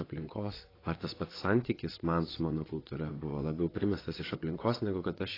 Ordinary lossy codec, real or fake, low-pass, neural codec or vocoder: AAC, 24 kbps; fake; 5.4 kHz; vocoder, 22.05 kHz, 80 mel bands, WaveNeXt